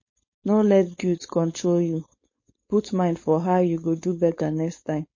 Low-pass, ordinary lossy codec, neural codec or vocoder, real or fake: 7.2 kHz; MP3, 32 kbps; codec, 16 kHz, 4.8 kbps, FACodec; fake